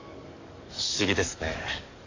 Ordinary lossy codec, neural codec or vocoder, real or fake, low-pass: AAC, 48 kbps; codec, 44.1 kHz, 7.8 kbps, DAC; fake; 7.2 kHz